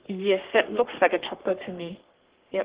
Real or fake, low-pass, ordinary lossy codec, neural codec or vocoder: fake; 3.6 kHz; Opus, 16 kbps; codec, 44.1 kHz, 3.4 kbps, Pupu-Codec